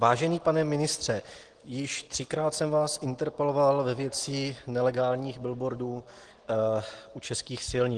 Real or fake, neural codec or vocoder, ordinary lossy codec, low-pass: real; none; Opus, 16 kbps; 9.9 kHz